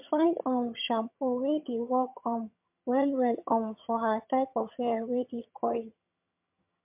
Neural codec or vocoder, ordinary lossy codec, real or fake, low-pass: vocoder, 22.05 kHz, 80 mel bands, HiFi-GAN; MP3, 32 kbps; fake; 3.6 kHz